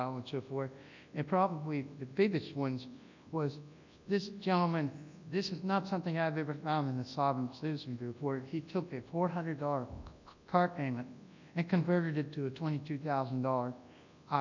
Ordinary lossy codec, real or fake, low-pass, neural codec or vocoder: MP3, 64 kbps; fake; 7.2 kHz; codec, 24 kHz, 0.9 kbps, WavTokenizer, large speech release